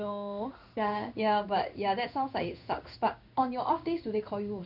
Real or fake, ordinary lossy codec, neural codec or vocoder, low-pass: fake; none; codec, 16 kHz in and 24 kHz out, 1 kbps, XY-Tokenizer; 5.4 kHz